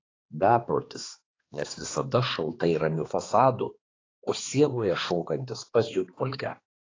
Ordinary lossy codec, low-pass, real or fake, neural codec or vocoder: AAC, 32 kbps; 7.2 kHz; fake; codec, 16 kHz, 2 kbps, X-Codec, HuBERT features, trained on balanced general audio